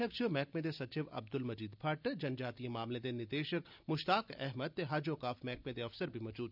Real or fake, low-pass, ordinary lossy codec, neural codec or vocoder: real; 5.4 kHz; none; none